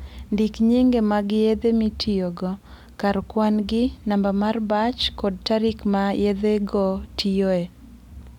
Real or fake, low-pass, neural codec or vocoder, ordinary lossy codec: real; 19.8 kHz; none; none